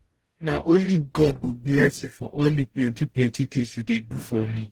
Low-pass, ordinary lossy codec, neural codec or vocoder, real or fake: 19.8 kHz; Opus, 16 kbps; codec, 44.1 kHz, 0.9 kbps, DAC; fake